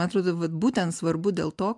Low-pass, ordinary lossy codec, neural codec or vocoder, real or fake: 10.8 kHz; MP3, 96 kbps; none; real